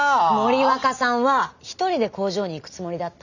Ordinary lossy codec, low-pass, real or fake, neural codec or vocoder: none; 7.2 kHz; real; none